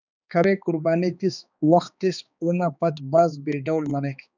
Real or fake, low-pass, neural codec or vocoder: fake; 7.2 kHz; codec, 16 kHz, 2 kbps, X-Codec, HuBERT features, trained on balanced general audio